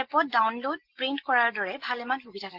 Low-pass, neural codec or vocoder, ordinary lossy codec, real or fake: 5.4 kHz; none; Opus, 16 kbps; real